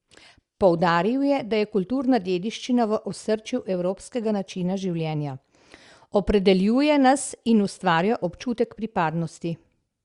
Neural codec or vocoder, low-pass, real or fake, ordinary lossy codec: none; 10.8 kHz; real; Opus, 64 kbps